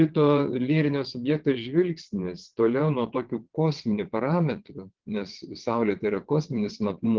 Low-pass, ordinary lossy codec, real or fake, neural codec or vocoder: 7.2 kHz; Opus, 16 kbps; fake; vocoder, 22.05 kHz, 80 mel bands, WaveNeXt